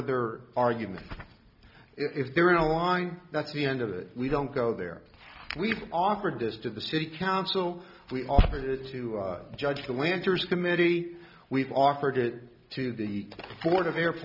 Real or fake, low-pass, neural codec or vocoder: real; 5.4 kHz; none